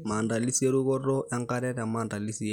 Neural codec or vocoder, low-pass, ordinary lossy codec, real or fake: none; 19.8 kHz; none; real